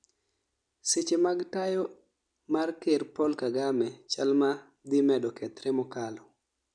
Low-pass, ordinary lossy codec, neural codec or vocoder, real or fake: 9.9 kHz; none; none; real